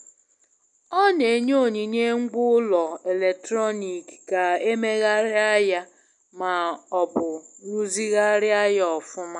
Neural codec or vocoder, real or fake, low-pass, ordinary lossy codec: none; real; 10.8 kHz; none